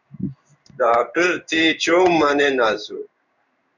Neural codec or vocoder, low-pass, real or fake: codec, 16 kHz in and 24 kHz out, 1 kbps, XY-Tokenizer; 7.2 kHz; fake